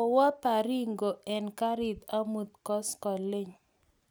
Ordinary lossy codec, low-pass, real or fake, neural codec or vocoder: none; none; real; none